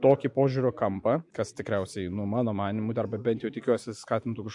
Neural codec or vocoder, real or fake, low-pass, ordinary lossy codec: none; real; 10.8 kHz; MP3, 64 kbps